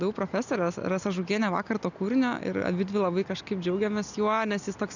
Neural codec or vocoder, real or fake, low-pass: none; real; 7.2 kHz